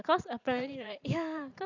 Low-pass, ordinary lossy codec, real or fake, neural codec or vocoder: 7.2 kHz; none; fake; vocoder, 44.1 kHz, 128 mel bands every 512 samples, BigVGAN v2